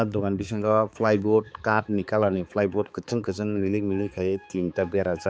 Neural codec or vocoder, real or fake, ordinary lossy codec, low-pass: codec, 16 kHz, 4 kbps, X-Codec, HuBERT features, trained on balanced general audio; fake; none; none